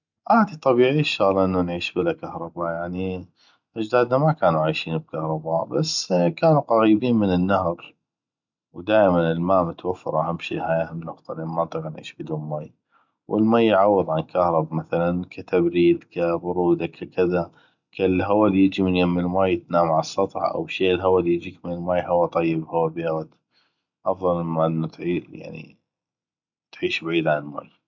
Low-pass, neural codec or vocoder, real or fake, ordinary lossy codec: 7.2 kHz; none; real; none